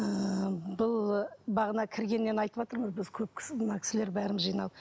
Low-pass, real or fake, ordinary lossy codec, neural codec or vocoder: none; real; none; none